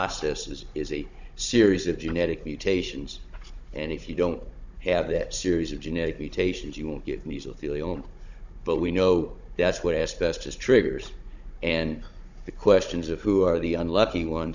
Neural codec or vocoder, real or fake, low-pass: codec, 16 kHz, 16 kbps, FunCodec, trained on Chinese and English, 50 frames a second; fake; 7.2 kHz